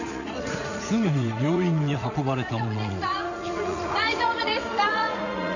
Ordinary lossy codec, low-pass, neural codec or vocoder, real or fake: none; 7.2 kHz; vocoder, 44.1 kHz, 80 mel bands, Vocos; fake